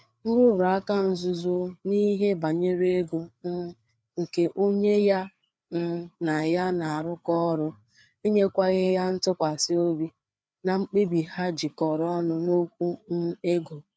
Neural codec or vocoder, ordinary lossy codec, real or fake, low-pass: codec, 16 kHz, 4 kbps, FreqCodec, larger model; none; fake; none